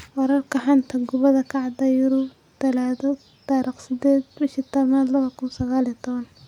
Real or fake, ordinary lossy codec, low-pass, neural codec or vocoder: real; none; 19.8 kHz; none